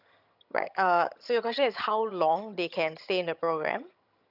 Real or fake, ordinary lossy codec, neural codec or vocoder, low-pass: fake; none; vocoder, 22.05 kHz, 80 mel bands, HiFi-GAN; 5.4 kHz